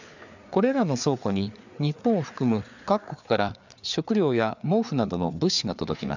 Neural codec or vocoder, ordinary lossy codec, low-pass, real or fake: codec, 16 kHz, 4 kbps, FreqCodec, larger model; none; 7.2 kHz; fake